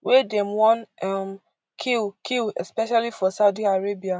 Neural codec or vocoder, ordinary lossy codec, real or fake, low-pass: none; none; real; none